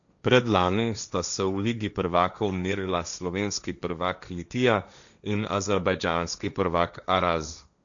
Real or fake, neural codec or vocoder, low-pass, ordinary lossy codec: fake; codec, 16 kHz, 1.1 kbps, Voila-Tokenizer; 7.2 kHz; none